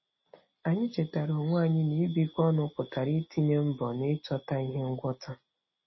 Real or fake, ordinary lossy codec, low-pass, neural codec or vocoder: real; MP3, 24 kbps; 7.2 kHz; none